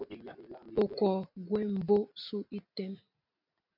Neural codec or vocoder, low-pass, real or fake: none; 5.4 kHz; real